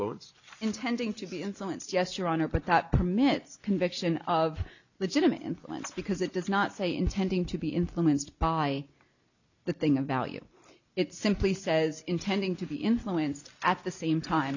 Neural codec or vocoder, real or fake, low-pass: none; real; 7.2 kHz